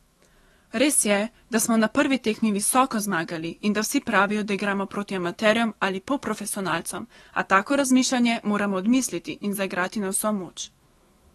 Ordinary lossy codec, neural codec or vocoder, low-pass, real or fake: AAC, 32 kbps; autoencoder, 48 kHz, 128 numbers a frame, DAC-VAE, trained on Japanese speech; 19.8 kHz; fake